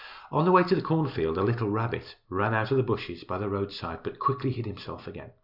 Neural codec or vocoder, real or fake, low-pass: none; real; 5.4 kHz